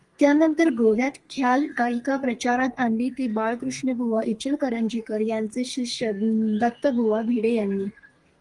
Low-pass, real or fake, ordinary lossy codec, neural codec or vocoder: 10.8 kHz; fake; Opus, 24 kbps; codec, 32 kHz, 1.9 kbps, SNAC